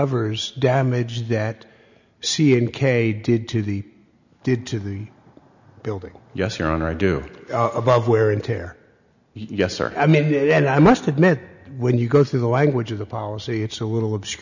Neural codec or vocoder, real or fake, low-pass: none; real; 7.2 kHz